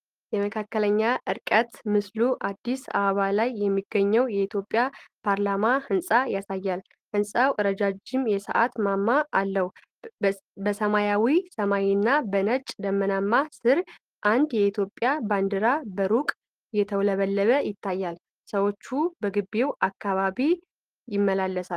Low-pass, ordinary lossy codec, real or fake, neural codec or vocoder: 14.4 kHz; Opus, 24 kbps; real; none